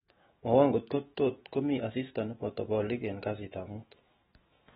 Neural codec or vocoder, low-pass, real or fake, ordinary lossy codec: autoencoder, 48 kHz, 128 numbers a frame, DAC-VAE, trained on Japanese speech; 19.8 kHz; fake; AAC, 16 kbps